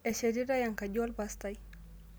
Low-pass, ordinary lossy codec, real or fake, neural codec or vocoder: none; none; real; none